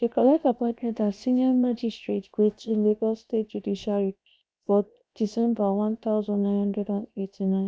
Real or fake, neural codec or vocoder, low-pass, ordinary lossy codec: fake; codec, 16 kHz, 0.7 kbps, FocalCodec; none; none